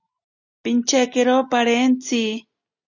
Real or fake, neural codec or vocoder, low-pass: real; none; 7.2 kHz